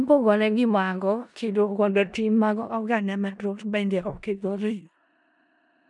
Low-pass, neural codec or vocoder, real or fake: 10.8 kHz; codec, 16 kHz in and 24 kHz out, 0.4 kbps, LongCat-Audio-Codec, four codebook decoder; fake